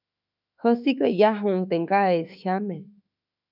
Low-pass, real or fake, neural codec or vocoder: 5.4 kHz; fake; autoencoder, 48 kHz, 32 numbers a frame, DAC-VAE, trained on Japanese speech